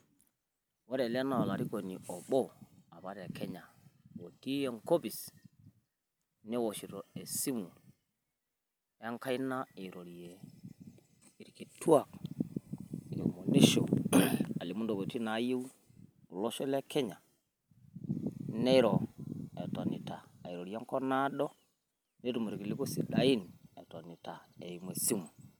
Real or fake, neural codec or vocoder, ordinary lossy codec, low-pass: real; none; none; none